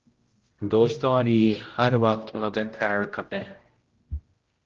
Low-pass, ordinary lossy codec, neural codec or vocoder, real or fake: 7.2 kHz; Opus, 16 kbps; codec, 16 kHz, 0.5 kbps, X-Codec, HuBERT features, trained on general audio; fake